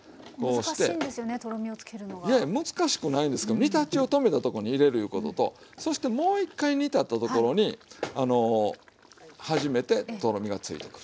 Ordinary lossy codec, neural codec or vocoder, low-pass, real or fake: none; none; none; real